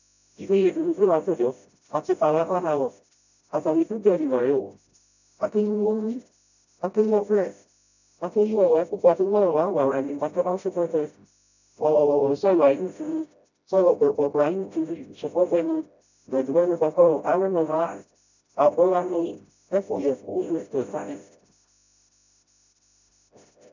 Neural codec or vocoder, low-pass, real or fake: codec, 16 kHz, 0.5 kbps, FreqCodec, smaller model; 7.2 kHz; fake